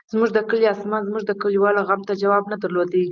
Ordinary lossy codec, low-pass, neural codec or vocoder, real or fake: Opus, 32 kbps; 7.2 kHz; none; real